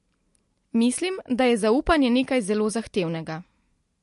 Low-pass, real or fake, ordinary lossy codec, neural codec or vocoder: 10.8 kHz; real; MP3, 48 kbps; none